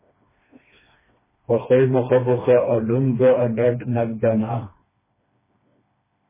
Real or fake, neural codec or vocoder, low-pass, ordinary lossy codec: fake; codec, 16 kHz, 2 kbps, FreqCodec, smaller model; 3.6 kHz; MP3, 16 kbps